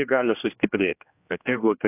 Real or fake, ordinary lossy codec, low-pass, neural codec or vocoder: fake; AAC, 24 kbps; 3.6 kHz; codec, 16 kHz, 1 kbps, X-Codec, HuBERT features, trained on general audio